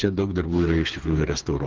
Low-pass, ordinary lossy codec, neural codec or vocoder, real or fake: 7.2 kHz; Opus, 16 kbps; codec, 16 kHz, 4 kbps, FreqCodec, smaller model; fake